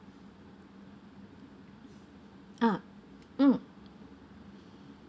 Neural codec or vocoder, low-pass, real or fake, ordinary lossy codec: none; none; real; none